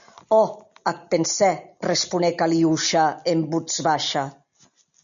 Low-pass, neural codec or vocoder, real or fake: 7.2 kHz; none; real